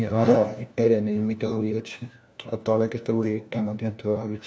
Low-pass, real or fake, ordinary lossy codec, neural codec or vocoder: none; fake; none; codec, 16 kHz, 1 kbps, FunCodec, trained on LibriTTS, 50 frames a second